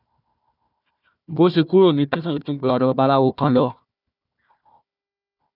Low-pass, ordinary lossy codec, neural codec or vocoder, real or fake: 5.4 kHz; none; codec, 16 kHz, 1 kbps, FunCodec, trained on Chinese and English, 50 frames a second; fake